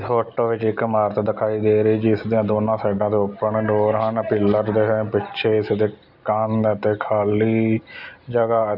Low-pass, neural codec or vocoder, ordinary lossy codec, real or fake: 5.4 kHz; none; none; real